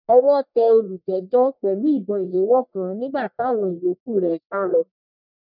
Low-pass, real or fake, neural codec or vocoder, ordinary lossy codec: 5.4 kHz; fake; codec, 44.1 kHz, 1.7 kbps, Pupu-Codec; none